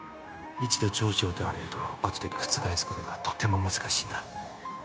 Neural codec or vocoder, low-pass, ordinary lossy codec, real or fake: codec, 16 kHz, 0.9 kbps, LongCat-Audio-Codec; none; none; fake